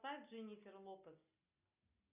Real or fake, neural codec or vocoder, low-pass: real; none; 3.6 kHz